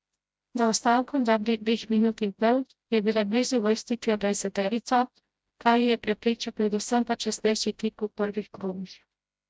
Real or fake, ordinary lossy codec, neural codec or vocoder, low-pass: fake; none; codec, 16 kHz, 0.5 kbps, FreqCodec, smaller model; none